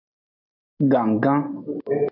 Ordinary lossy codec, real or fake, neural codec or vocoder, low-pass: MP3, 48 kbps; real; none; 5.4 kHz